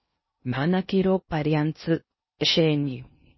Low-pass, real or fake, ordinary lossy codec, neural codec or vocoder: 7.2 kHz; fake; MP3, 24 kbps; codec, 16 kHz in and 24 kHz out, 0.6 kbps, FocalCodec, streaming, 2048 codes